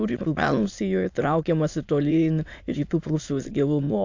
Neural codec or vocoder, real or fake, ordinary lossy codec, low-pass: autoencoder, 22.05 kHz, a latent of 192 numbers a frame, VITS, trained on many speakers; fake; AAC, 48 kbps; 7.2 kHz